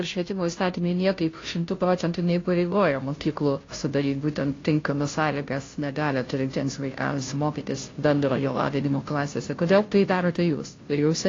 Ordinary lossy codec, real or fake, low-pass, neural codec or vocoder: AAC, 32 kbps; fake; 7.2 kHz; codec, 16 kHz, 0.5 kbps, FunCodec, trained on Chinese and English, 25 frames a second